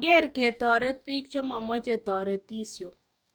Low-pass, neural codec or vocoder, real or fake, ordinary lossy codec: none; codec, 44.1 kHz, 2.6 kbps, DAC; fake; none